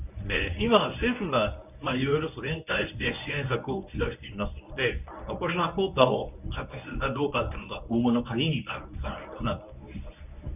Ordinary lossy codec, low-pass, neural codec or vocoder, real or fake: none; 3.6 kHz; codec, 24 kHz, 0.9 kbps, WavTokenizer, medium speech release version 1; fake